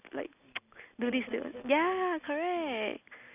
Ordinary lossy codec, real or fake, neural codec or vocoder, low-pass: none; real; none; 3.6 kHz